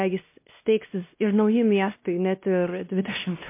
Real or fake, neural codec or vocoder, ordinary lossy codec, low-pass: fake; codec, 16 kHz, 1 kbps, X-Codec, WavLM features, trained on Multilingual LibriSpeech; MP3, 24 kbps; 3.6 kHz